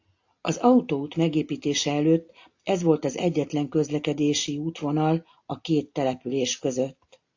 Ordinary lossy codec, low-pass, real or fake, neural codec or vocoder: AAC, 48 kbps; 7.2 kHz; real; none